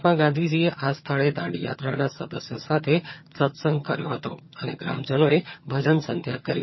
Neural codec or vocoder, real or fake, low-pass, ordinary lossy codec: vocoder, 22.05 kHz, 80 mel bands, HiFi-GAN; fake; 7.2 kHz; MP3, 24 kbps